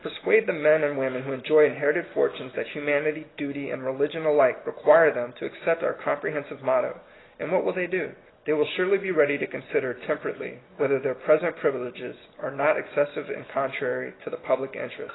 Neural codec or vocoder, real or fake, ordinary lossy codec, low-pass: none; real; AAC, 16 kbps; 7.2 kHz